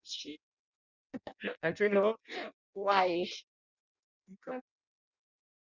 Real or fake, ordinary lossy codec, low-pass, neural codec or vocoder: fake; none; 7.2 kHz; codec, 16 kHz in and 24 kHz out, 0.6 kbps, FireRedTTS-2 codec